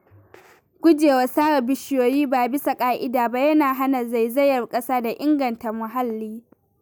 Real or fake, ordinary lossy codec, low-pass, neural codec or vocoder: real; none; none; none